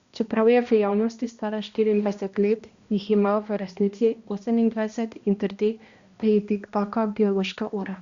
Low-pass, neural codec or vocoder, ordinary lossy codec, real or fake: 7.2 kHz; codec, 16 kHz, 1 kbps, X-Codec, HuBERT features, trained on balanced general audio; Opus, 64 kbps; fake